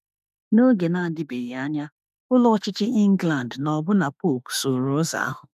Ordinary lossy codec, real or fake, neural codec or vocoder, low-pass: none; fake; autoencoder, 48 kHz, 32 numbers a frame, DAC-VAE, trained on Japanese speech; 14.4 kHz